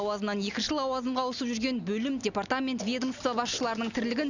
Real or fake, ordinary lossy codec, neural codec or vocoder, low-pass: real; none; none; 7.2 kHz